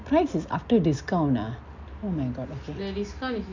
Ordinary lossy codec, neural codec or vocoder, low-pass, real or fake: MP3, 64 kbps; none; 7.2 kHz; real